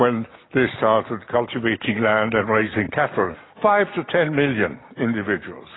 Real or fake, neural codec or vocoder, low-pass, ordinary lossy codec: real; none; 7.2 kHz; AAC, 16 kbps